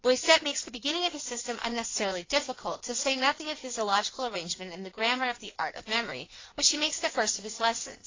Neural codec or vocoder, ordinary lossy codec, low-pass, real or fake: codec, 16 kHz in and 24 kHz out, 1.1 kbps, FireRedTTS-2 codec; AAC, 32 kbps; 7.2 kHz; fake